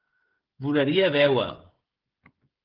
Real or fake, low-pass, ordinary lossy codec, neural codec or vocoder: fake; 5.4 kHz; Opus, 16 kbps; codec, 16 kHz, 16 kbps, FreqCodec, smaller model